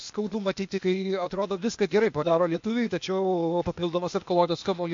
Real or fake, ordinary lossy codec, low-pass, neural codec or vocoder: fake; MP3, 48 kbps; 7.2 kHz; codec, 16 kHz, 0.8 kbps, ZipCodec